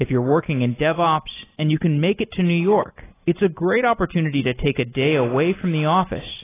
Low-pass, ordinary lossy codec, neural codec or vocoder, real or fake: 3.6 kHz; AAC, 16 kbps; none; real